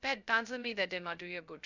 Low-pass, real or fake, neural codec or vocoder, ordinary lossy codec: 7.2 kHz; fake; codec, 16 kHz, 0.2 kbps, FocalCodec; none